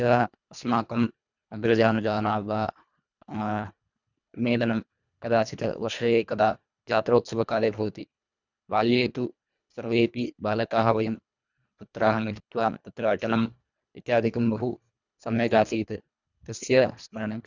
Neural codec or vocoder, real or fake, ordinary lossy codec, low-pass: codec, 24 kHz, 1.5 kbps, HILCodec; fake; none; 7.2 kHz